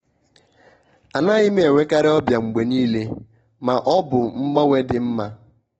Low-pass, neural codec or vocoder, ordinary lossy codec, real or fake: 19.8 kHz; none; AAC, 24 kbps; real